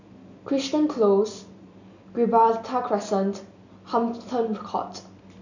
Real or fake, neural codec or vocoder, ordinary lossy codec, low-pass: real; none; none; 7.2 kHz